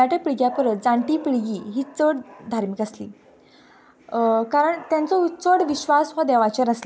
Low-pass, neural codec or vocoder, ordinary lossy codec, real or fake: none; none; none; real